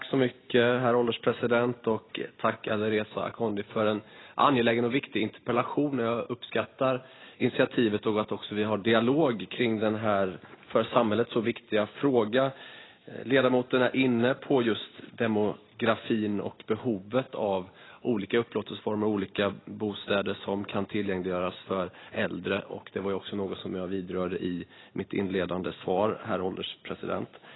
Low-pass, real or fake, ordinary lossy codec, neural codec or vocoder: 7.2 kHz; real; AAC, 16 kbps; none